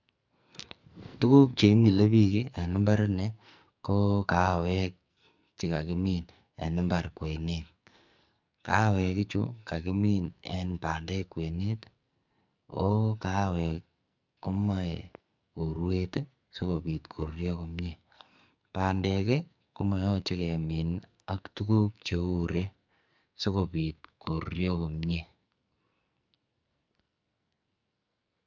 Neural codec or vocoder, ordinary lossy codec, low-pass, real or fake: codec, 44.1 kHz, 2.6 kbps, SNAC; MP3, 64 kbps; 7.2 kHz; fake